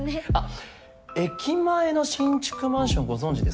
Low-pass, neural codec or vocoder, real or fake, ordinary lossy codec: none; none; real; none